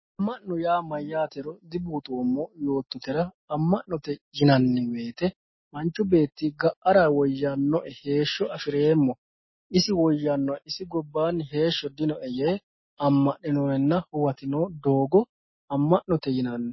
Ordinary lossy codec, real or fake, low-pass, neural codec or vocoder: MP3, 24 kbps; real; 7.2 kHz; none